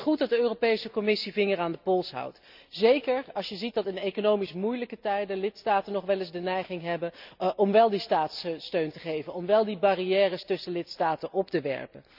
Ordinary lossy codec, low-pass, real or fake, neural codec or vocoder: none; 5.4 kHz; real; none